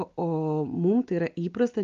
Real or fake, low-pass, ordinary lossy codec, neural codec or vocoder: real; 7.2 kHz; Opus, 24 kbps; none